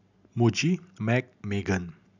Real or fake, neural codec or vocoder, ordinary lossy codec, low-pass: real; none; none; 7.2 kHz